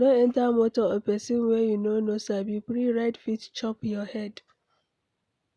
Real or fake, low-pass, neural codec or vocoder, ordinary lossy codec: real; none; none; none